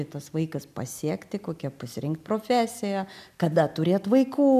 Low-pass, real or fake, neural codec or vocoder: 14.4 kHz; real; none